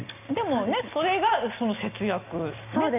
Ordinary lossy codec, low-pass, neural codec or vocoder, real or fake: none; 3.6 kHz; none; real